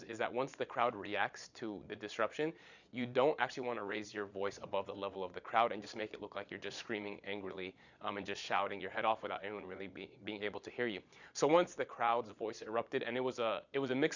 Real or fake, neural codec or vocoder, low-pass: fake; vocoder, 22.05 kHz, 80 mel bands, Vocos; 7.2 kHz